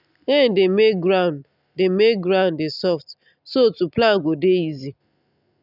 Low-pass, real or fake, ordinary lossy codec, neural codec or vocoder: 5.4 kHz; real; none; none